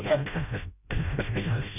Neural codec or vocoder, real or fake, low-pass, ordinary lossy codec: codec, 16 kHz, 0.5 kbps, FreqCodec, smaller model; fake; 3.6 kHz; none